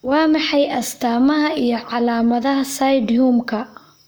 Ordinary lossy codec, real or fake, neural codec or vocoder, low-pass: none; fake; codec, 44.1 kHz, 7.8 kbps, Pupu-Codec; none